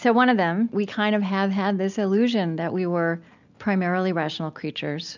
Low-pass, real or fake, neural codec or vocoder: 7.2 kHz; real; none